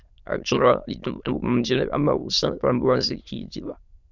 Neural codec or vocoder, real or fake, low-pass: autoencoder, 22.05 kHz, a latent of 192 numbers a frame, VITS, trained on many speakers; fake; 7.2 kHz